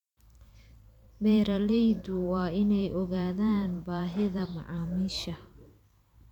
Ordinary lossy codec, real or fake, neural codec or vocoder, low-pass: none; fake; vocoder, 44.1 kHz, 128 mel bands every 512 samples, BigVGAN v2; 19.8 kHz